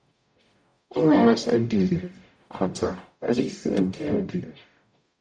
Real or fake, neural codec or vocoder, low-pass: fake; codec, 44.1 kHz, 0.9 kbps, DAC; 9.9 kHz